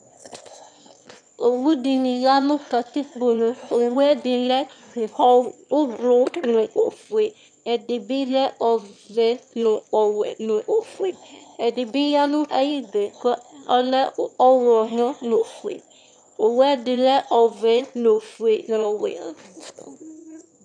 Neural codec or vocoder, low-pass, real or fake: autoencoder, 22.05 kHz, a latent of 192 numbers a frame, VITS, trained on one speaker; 9.9 kHz; fake